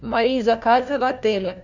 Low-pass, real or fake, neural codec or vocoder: 7.2 kHz; fake; codec, 16 kHz, 1 kbps, FunCodec, trained on LibriTTS, 50 frames a second